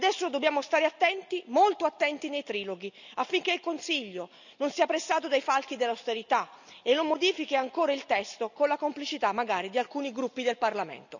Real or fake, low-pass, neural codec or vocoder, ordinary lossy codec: real; 7.2 kHz; none; none